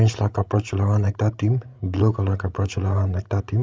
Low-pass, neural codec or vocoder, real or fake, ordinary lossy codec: none; codec, 16 kHz, 16 kbps, FreqCodec, larger model; fake; none